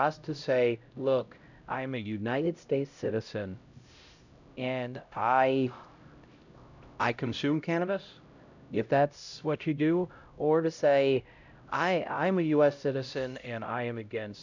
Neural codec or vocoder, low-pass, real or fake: codec, 16 kHz, 0.5 kbps, X-Codec, HuBERT features, trained on LibriSpeech; 7.2 kHz; fake